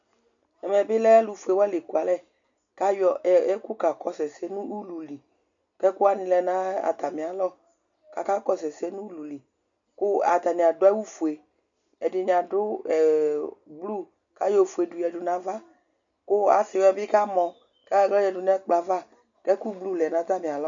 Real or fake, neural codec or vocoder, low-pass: real; none; 7.2 kHz